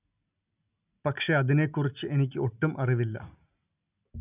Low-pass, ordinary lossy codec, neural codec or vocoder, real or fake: 3.6 kHz; none; none; real